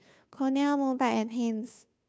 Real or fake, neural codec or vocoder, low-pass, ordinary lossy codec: fake; codec, 16 kHz, 2 kbps, FunCodec, trained on Chinese and English, 25 frames a second; none; none